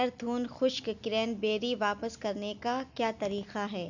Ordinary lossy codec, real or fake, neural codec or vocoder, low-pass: none; real; none; 7.2 kHz